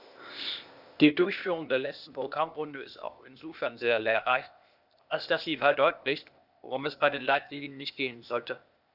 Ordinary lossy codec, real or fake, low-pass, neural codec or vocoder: none; fake; 5.4 kHz; codec, 16 kHz, 0.8 kbps, ZipCodec